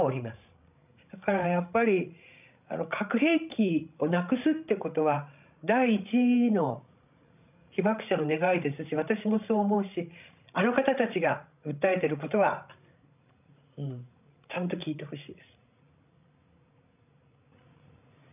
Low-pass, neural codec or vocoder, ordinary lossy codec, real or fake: 3.6 kHz; codec, 16 kHz, 16 kbps, FreqCodec, larger model; none; fake